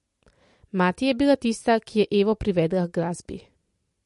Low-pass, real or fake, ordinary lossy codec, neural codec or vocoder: 14.4 kHz; real; MP3, 48 kbps; none